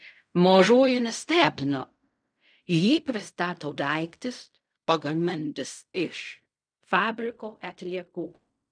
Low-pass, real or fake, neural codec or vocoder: 9.9 kHz; fake; codec, 16 kHz in and 24 kHz out, 0.4 kbps, LongCat-Audio-Codec, fine tuned four codebook decoder